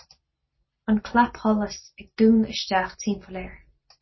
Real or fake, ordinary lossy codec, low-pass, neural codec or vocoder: real; MP3, 24 kbps; 7.2 kHz; none